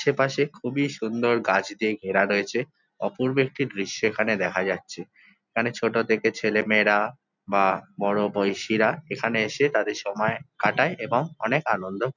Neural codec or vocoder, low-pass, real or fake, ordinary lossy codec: none; 7.2 kHz; real; none